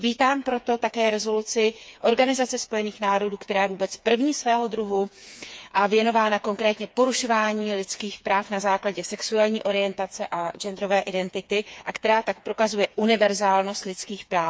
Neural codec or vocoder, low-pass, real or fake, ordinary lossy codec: codec, 16 kHz, 4 kbps, FreqCodec, smaller model; none; fake; none